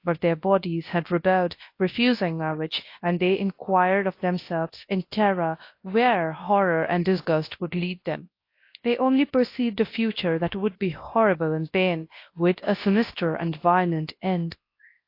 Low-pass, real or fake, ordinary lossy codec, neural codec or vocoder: 5.4 kHz; fake; AAC, 32 kbps; codec, 24 kHz, 0.9 kbps, WavTokenizer, large speech release